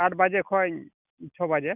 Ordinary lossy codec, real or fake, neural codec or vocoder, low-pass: none; real; none; 3.6 kHz